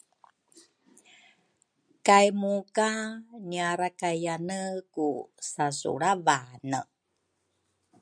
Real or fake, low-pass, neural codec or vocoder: real; 9.9 kHz; none